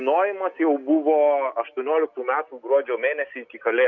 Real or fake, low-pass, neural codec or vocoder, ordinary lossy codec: real; 7.2 kHz; none; MP3, 48 kbps